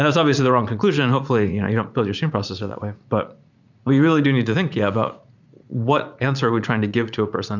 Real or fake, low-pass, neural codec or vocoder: fake; 7.2 kHz; vocoder, 44.1 kHz, 80 mel bands, Vocos